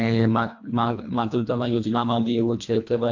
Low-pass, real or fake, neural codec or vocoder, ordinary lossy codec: 7.2 kHz; fake; codec, 24 kHz, 1.5 kbps, HILCodec; AAC, 48 kbps